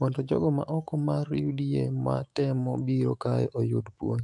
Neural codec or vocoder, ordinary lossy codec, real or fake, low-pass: autoencoder, 48 kHz, 128 numbers a frame, DAC-VAE, trained on Japanese speech; AAC, 64 kbps; fake; 10.8 kHz